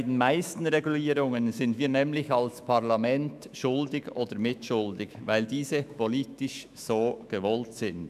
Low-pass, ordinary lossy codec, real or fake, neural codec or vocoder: 14.4 kHz; none; fake; autoencoder, 48 kHz, 128 numbers a frame, DAC-VAE, trained on Japanese speech